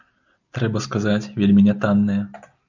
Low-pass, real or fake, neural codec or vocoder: 7.2 kHz; real; none